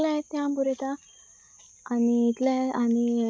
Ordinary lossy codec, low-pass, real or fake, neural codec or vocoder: none; none; real; none